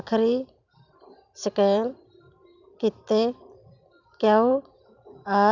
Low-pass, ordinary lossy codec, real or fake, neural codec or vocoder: 7.2 kHz; none; fake; vocoder, 44.1 kHz, 128 mel bands every 256 samples, BigVGAN v2